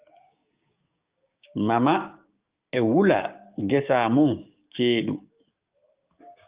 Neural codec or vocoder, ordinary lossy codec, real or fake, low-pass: codec, 16 kHz, 6 kbps, DAC; Opus, 32 kbps; fake; 3.6 kHz